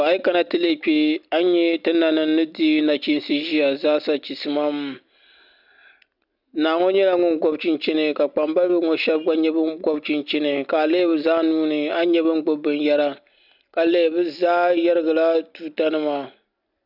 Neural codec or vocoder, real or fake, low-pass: none; real; 5.4 kHz